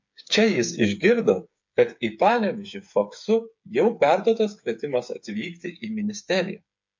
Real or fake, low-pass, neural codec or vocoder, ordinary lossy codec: fake; 7.2 kHz; codec, 16 kHz, 8 kbps, FreqCodec, smaller model; MP3, 48 kbps